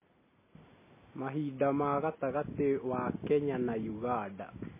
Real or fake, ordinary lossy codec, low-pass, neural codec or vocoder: real; MP3, 16 kbps; 3.6 kHz; none